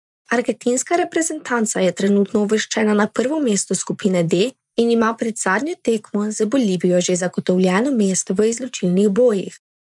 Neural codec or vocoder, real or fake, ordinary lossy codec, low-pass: vocoder, 24 kHz, 100 mel bands, Vocos; fake; MP3, 96 kbps; 10.8 kHz